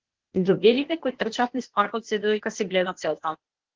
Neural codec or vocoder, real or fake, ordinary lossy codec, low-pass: codec, 16 kHz, 0.8 kbps, ZipCodec; fake; Opus, 16 kbps; 7.2 kHz